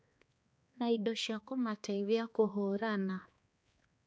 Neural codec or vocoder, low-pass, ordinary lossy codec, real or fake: codec, 16 kHz, 2 kbps, X-Codec, HuBERT features, trained on balanced general audio; none; none; fake